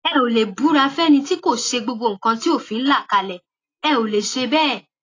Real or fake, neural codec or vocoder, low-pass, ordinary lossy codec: real; none; 7.2 kHz; AAC, 32 kbps